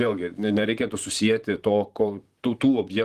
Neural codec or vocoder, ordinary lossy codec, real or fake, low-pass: vocoder, 44.1 kHz, 128 mel bands, Pupu-Vocoder; Opus, 24 kbps; fake; 14.4 kHz